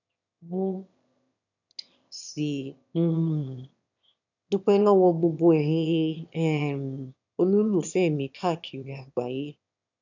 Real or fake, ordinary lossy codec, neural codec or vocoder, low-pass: fake; none; autoencoder, 22.05 kHz, a latent of 192 numbers a frame, VITS, trained on one speaker; 7.2 kHz